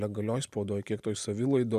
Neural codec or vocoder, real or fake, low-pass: none; real; 14.4 kHz